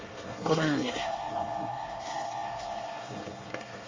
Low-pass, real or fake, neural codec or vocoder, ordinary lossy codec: 7.2 kHz; fake; codec, 24 kHz, 1 kbps, SNAC; Opus, 32 kbps